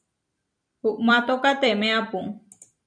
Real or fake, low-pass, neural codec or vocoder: fake; 9.9 kHz; vocoder, 44.1 kHz, 128 mel bands every 256 samples, BigVGAN v2